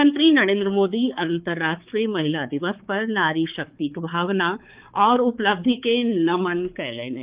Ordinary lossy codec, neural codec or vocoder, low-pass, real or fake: Opus, 32 kbps; codec, 16 kHz, 4 kbps, X-Codec, HuBERT features, trained on balanced general audio; 3.6 kHz; fake